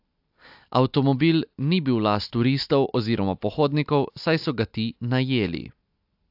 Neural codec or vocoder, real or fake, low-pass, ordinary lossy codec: none; real; 5.4 kHz; AAC, 48 kbps